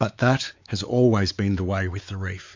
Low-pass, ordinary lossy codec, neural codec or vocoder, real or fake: 7.2 kHz; MP3, 64 kbps; codec, 16 kHz, 4 kbps, X-Codec, WavLM features, trained on Multilingual LibriSpeech; fake